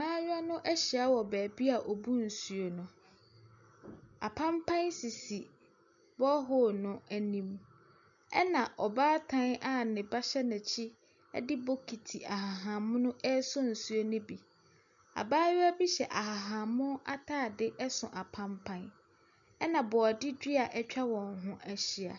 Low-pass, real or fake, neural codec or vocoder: 7.2 kHz; real; none